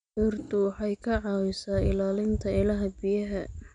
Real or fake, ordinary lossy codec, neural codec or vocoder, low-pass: real; none; none; 9.9 kHz